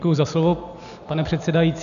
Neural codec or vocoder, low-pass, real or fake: none; 7.2 kHz; real